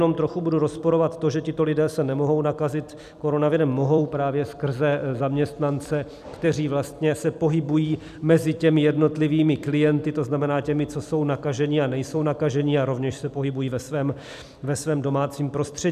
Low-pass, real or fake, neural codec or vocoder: 14.4 kHz; fake; vocoder, 44.1 kHz, 128 mel bands every 256 samples, BigVGAN v2